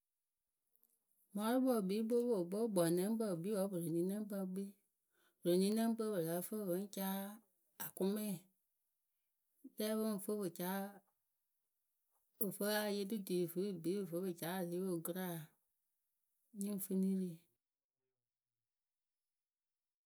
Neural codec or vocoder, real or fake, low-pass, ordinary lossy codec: none; real; none; none